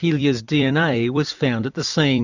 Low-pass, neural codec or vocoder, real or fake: 7.2 kHz; vocoder, 44.1 kHz, 128 mel bands every 256 samples, BigVGAN v2; fake